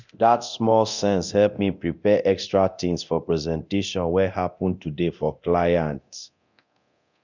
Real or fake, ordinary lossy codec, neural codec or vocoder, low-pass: fake; none; codec, 24 kHz, 0.9 kbps, DualCodec; 7.2 kHz